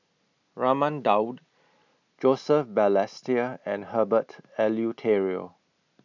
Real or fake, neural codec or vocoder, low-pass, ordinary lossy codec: real; none; 7.2 kHz; none